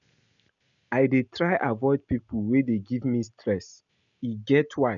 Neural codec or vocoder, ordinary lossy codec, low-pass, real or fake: none; none; 7.2 kHz; real